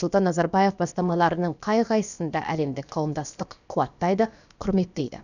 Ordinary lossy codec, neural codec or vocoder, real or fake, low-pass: none; codec, 16 kHz, about 1 kbps, DyCAST, with the encoder's durations; fake; 7.2 kHz